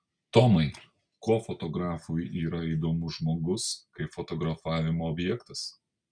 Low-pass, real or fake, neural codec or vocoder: 9.9 kHz; real; none